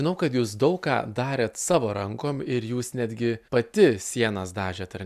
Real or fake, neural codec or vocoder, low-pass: real; none; 14.4 kHz